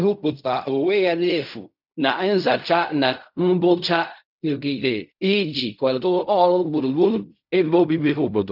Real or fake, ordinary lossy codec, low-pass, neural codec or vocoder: fake; none; 5.4 kHz; codec, 16 kHz in and 24 kHz out, 0.4 kbps, LongCat-Audio-Codec, fine tuned four codebook decoder